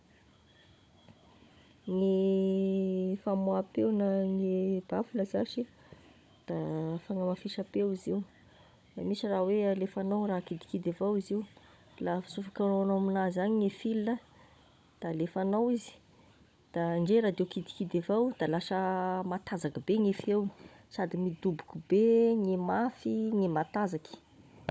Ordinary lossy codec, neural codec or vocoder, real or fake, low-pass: none; codec, 16 kHz, 16 kbps, FunCodec, trained on Chinese and English, 50 frames a second; fake; none